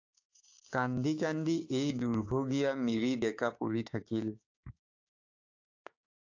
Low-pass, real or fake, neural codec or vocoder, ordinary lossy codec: 7.2 kHz; fake; codec, 16 kHz, 6 kbps, DAC; AAC, 48 kbps